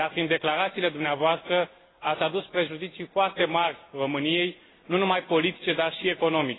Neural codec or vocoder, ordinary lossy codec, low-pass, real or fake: none; AAC, 16 kbps; 7.2 kHz; real